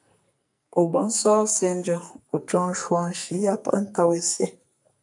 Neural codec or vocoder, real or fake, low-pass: codec, 44.1 kHz, 2.6 kbps, SNAC; fake; 10.8 kHz